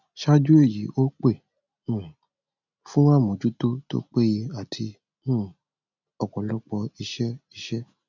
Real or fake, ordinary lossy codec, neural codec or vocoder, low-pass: real; none; none; 7.2 kHz